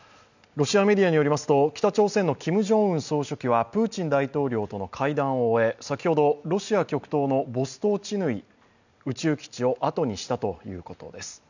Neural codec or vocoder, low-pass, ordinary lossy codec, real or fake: none; 7.2 kHz; none; real